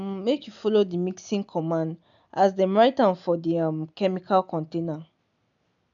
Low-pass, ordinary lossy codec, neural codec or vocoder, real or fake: 7.2 kHz; none; none; real